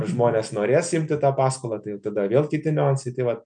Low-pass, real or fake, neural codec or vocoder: 9.9 kHz; real; none